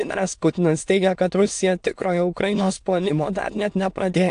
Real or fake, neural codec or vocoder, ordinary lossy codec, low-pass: fake; autoencoder, 22.05 kHz, a latent of 192 numbers a frame, VITS, trained on many speakers; AAC, 64 kbps; 9.9 kHz